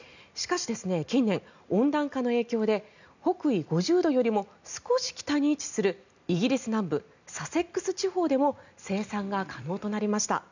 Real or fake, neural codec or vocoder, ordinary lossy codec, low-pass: real; none; none; 7.2 kHz